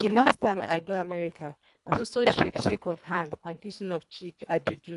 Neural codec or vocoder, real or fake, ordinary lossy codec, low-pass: codec, 24 kHz, 1.5 kbps, HILCodec; fake; none; 10.8 kHz